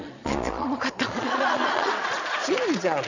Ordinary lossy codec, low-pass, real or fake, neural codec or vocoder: none; 7.2 kHz; fake; vocoder, 22.05 kHz, 80 mel bands, Vocos